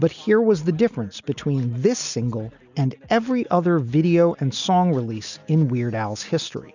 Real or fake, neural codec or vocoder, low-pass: real; none; 7.2 kHz